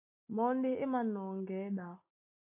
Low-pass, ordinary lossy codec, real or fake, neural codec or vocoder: 3.6 kHz; AAC, 32 kbps; real; none